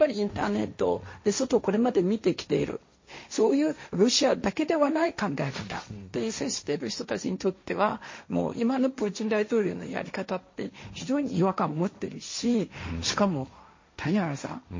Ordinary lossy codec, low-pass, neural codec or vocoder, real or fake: MP3, 32 kbps; 7.2 kHz; codec, 16 kHz, 1.1 kbps, Voila-Tokenizer; fake